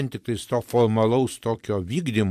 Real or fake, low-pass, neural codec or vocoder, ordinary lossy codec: fake; 14.4 kHz; vocoder, 44.1 kHz, 128 mel bands every 256 samples, BigVGAN v2; MP3, 96 kbps